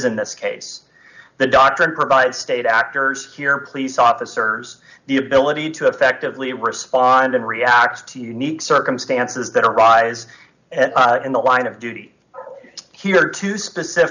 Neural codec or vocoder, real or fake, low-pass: none; real; 7.2 kHz